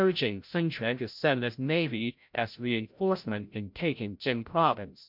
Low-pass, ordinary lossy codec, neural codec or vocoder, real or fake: 5.4 kHz; MP3, 48 kbps; codec, 16 kHz, 0.5 kbps, FreqCodec, larger model; fake